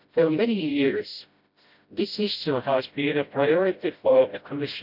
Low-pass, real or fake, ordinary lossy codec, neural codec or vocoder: 5.4 kHz; fake; none; codec, 16 kHz, 0.5 kbps, FreqCodec, smaller model